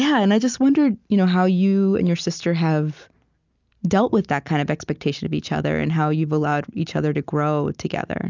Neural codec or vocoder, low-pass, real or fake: none; 7.2 kHz; real